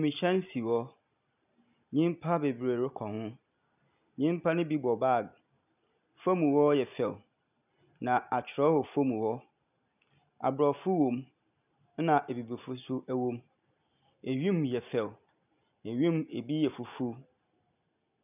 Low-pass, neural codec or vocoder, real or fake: 3.6 kHz; none; real